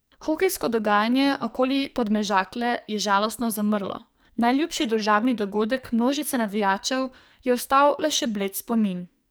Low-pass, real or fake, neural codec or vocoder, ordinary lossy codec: none; fake; codec, 44.1 kHz, 2.6 kbps, SNAC; none